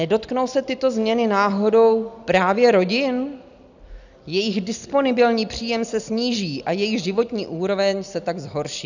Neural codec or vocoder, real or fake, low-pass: none; real; 7.2 kHz